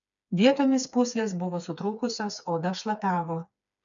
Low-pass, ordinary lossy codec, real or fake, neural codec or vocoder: 7.2 kHz; MP3, 96 kbps; fake; codec, 16 kHz, 4 kbps, FreqCodec, smaller model